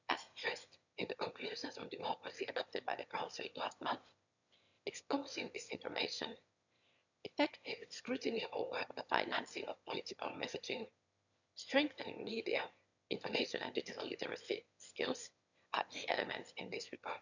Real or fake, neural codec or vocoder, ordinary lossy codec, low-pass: fake; autoencoder, 22.05 kHz, a latent of 192 numbers a frame, VITS, trained on one speaker; none; 7.2 kHz